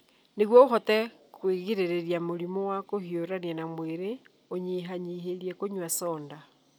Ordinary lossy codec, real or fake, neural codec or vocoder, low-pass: none; real; none; none